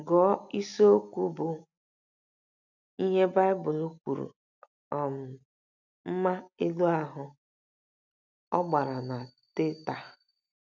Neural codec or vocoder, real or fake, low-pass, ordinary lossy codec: none; real; 7.2 kHz; none